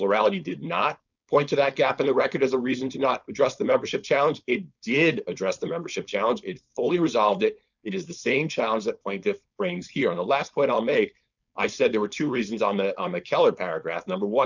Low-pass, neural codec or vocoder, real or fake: 7.2 kHz; codec, 16 kHz, 4.8 kbps, FACodec; fake